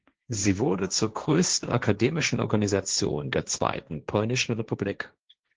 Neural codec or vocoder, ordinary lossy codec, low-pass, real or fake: codec, 16 kHz, 1.1 kbps, Voila-Tokenizer; Opus, 16 kbps; 7.2 kHz; fake